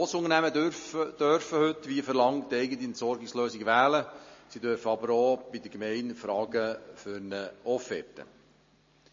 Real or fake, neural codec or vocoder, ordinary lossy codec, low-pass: real; none; MP3, 32 kbps; 7.2 kHz